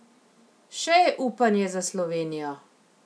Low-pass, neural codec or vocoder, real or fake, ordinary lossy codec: none; none; real; none